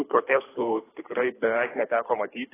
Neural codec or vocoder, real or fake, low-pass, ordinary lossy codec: codec, 24 kHz, 3 kbps, HILCodec; fake; 3.6 kHz; AAC, 16 kbps